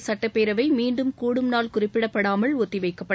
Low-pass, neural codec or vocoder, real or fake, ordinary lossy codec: none; none; real; none